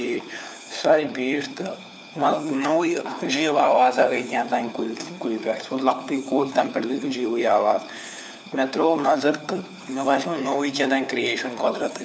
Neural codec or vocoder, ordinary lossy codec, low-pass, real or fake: codec, 16 kHz, 4 kbps, FunCodec, trained on LibriTTS, 50 frames a second; none; none; fake